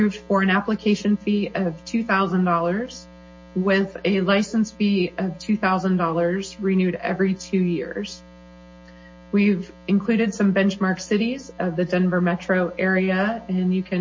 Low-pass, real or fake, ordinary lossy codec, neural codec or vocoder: 7.2 kHz; real; MP3, 32 kbps; none